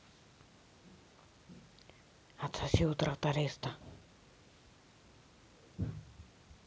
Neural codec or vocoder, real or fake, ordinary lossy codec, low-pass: none; real; none; none